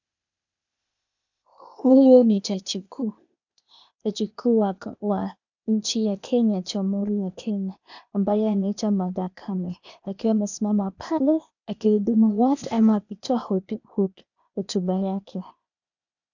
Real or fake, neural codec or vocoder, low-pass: fake; codec, 16 kHz, 0.8 kbps, ZipCodec; 7.2 kHz